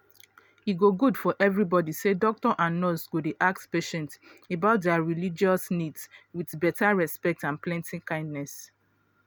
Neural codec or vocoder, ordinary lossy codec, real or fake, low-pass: none; none; real; none